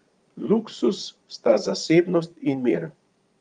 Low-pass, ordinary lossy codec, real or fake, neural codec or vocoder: 9.9 kHz; Opus, 32 kbps; fake; vocoder, 22.05 kHz, 80 mel bands, Vocos